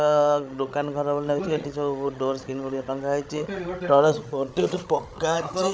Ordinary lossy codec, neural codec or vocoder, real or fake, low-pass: none; codec, 16 kHz, 16 kbps, FreqCodec, larger model; fake; none